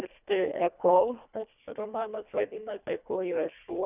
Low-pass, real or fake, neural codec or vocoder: 3.6 kHz; fake; codec, 24 kHz, 1.5 kbps, HILCodec